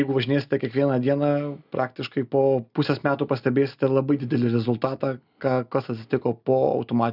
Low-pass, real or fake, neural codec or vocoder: 5.4 kHz; real; none